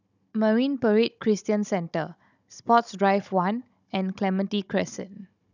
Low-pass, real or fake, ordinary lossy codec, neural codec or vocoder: 7.2 kHz; fake; none; codec, 16 kHz, 16 kbps, FunCodec, trained on Chinese and English, 50 frames a second